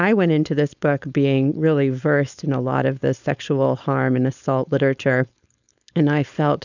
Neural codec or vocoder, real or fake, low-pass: codec, 16 kHz, 4.8 kbps, FACodec; fake; 7.2 kHz